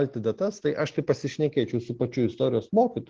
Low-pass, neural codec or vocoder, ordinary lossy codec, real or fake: 7.2 kHz; none; Opus, 16 kbps; real